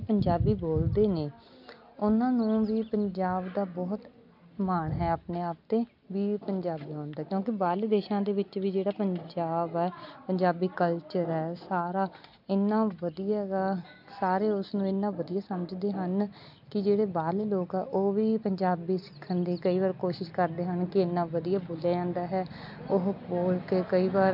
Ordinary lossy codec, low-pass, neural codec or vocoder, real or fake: MP3, 48 kbps; 5.4 kHz; none; real